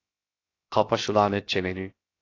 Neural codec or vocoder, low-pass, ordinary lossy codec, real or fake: codec, 16 kHz, 0.7 kbps, FocalCodec; 7.2 kHz; AAC, 32 kbps; fake